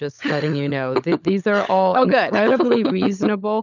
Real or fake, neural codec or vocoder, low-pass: fake; codec, 16 kHz, 16 kbps, FunCodec, trained on LibriTTS, 50 frames a second; 7.2 kHz